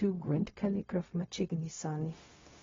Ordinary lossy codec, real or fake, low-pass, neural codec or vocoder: AAC, 24 kbps; fake; 7.2 kHz; codec, 16 kHz, 0.4 kbps, LongCat-Audio-Codec